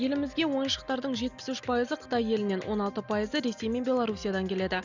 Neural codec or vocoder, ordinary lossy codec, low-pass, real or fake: none; none; 7.2 kHz; real